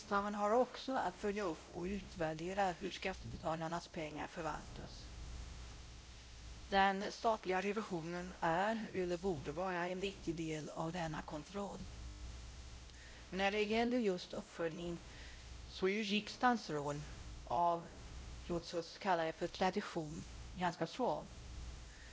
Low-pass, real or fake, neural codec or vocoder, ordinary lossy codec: none; fake; codec, 16 kHz, 0.5 kbps, X-Codec, WavLM features, trained on Multilingual LibriSpeech; none